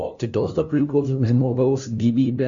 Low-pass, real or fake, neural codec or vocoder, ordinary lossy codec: 7.2 kHz; fake; codec, 16 kHz, 0.5 kbps, FunCodec, trained on LibriTTS, 25 frames a second; MP3, 64 kbps